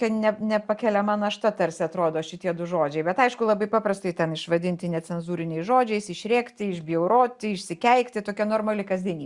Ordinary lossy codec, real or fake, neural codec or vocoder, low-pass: Opus, 64 kbps; real; none; 10.8 kHz